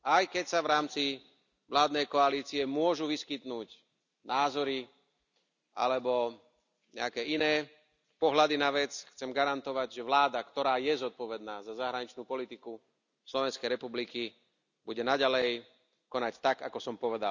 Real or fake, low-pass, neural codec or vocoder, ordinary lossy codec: real; 7.2 kHz; none; none